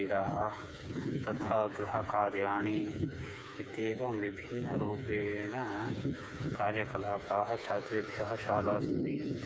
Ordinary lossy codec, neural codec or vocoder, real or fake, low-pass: none; codec, 16 kHz, 4 kbps, FreqCodec, smaller model; fake; none